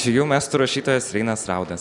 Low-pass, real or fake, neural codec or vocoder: 10.8 kHz; real; none